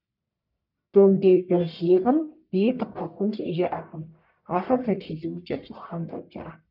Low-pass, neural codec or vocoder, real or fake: 5.4 kHz; codec, 44.1 kHz, 1.7 kbps, Pupu-Codec; fake